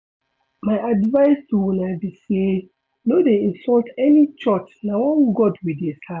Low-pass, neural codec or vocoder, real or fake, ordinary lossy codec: none; none; real; none